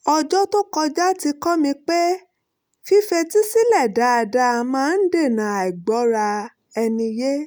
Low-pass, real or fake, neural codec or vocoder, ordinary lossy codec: none; real; none; none